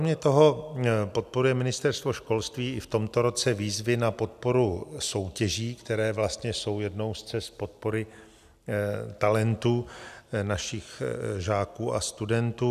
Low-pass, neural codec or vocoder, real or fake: 14.4 kHz; none; real